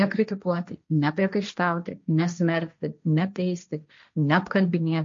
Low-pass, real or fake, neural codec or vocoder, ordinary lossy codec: 7.2 kHz; fake; codec, 16 kHz, 1.1 kbps, Voila-Tokenizer; MP3, 48 kbps